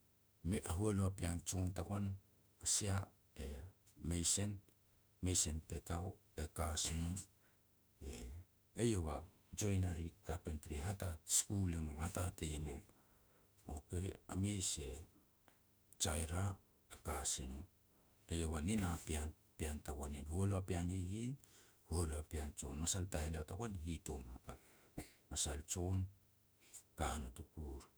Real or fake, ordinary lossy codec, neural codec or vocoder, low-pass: fake; none; autoencoder, 48 kHz, 32 numbers a frame, DAC-VAE, trained on Japanese speech; none